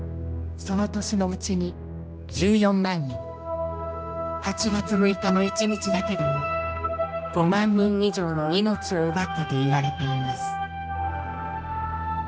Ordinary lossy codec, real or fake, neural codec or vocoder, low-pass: none; fake; codec, 16 kHz, 1 kbps, X-Codec, HuBERT features, trained on general audio; none